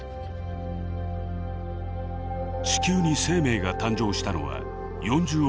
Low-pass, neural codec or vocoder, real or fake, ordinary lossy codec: none; none; real; none